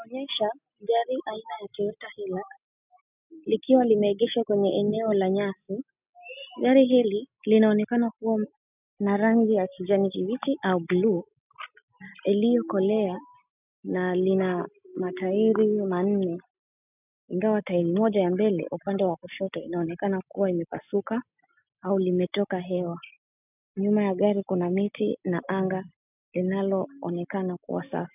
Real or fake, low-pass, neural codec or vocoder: real; 3.6 kHz; none